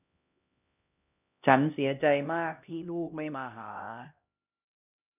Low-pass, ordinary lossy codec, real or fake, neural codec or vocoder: 3.6 kHz; none; fake; codec, 16 kHz, 1 kbps, X-Codec, HuBERT features, trained on LibriSpeech